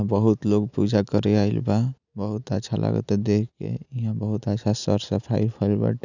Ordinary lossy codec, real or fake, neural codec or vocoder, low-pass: none; real; none; 7.2 kHz